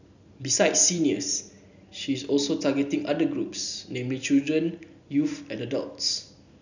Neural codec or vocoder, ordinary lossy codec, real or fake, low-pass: none; none; real; 7.2 kHz